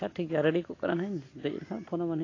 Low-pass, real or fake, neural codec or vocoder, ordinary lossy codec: 7.2 kHz; real; none; AAC, 32 kbps